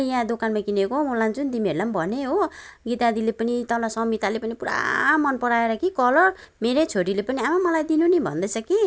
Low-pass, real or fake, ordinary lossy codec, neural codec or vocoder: none; real; none; none